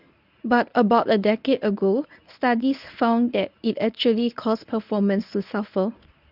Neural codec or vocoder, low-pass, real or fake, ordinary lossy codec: codec, 24 kHz, 0.9 kbps, WavTokenizer, medium speech release version 1; 5.4 kHz; fake; none